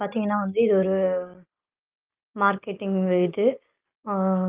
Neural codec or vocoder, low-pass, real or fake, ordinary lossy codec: none; 3.6 kHz; real; Opus, 32 kbps